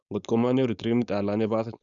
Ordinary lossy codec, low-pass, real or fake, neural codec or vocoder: none; 7.2 kHz; fake; codec, 16 kHz, 4.8 kbps, FACodec